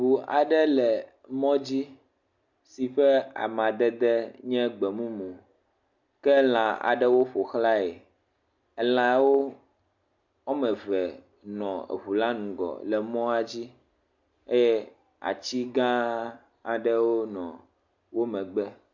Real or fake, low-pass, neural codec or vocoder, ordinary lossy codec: real; 7.2 kHz; none; MP3, 64 kbps